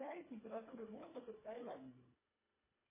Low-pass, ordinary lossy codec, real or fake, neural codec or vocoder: 3.6 kHz; MP3, 16 kbps; fake; codec, 24 kHz, 1.5 kbps, HILCodec